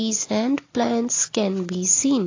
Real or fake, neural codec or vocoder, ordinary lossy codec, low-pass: real; none; AAC, 32 kbps; 7.2 kHz